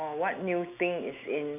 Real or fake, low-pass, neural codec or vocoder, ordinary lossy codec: real; 3.6 kHz; none; none